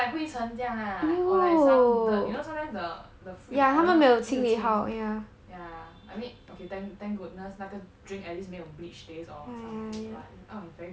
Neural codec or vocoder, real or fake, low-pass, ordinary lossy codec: none; real; none; none